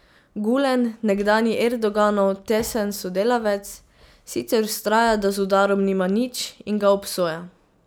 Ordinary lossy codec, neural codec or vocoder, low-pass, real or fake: none; none; none; real